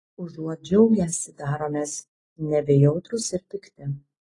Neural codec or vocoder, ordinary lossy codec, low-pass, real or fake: none; AAC, 32 kbps; 10.8 kHz; real